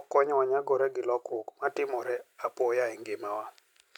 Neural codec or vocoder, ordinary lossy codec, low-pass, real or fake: none; none; 19.8 kHz; real